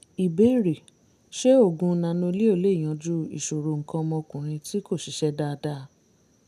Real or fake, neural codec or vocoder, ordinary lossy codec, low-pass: real; none; none; 14.4 kHz